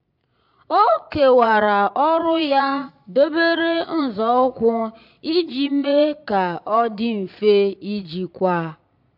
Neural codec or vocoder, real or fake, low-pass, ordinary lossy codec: vocoder, 22.05 kHz, 80 mel bands, Vocos; fake; 5.4 kHz; AAC, 48 kbps